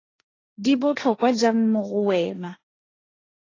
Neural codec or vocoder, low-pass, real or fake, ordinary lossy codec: codec, 16 kHz, 1.1 kbps, Voila-Tokenizer; 7.2 kHz; fake; AAC, 32 kbps